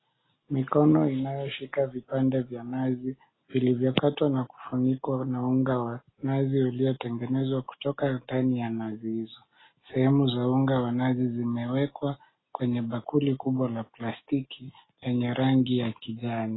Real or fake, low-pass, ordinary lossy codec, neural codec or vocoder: real; 7.2 kHz; AAC, 16 kbps; none